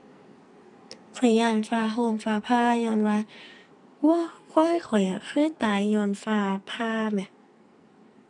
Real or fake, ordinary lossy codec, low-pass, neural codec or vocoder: fake; none; 10.8 kHz; codec, 44.1 kHz, 2.6 kbps, SNAC